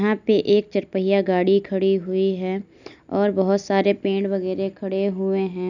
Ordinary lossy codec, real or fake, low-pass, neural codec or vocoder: none; real; 7.2 kHz; none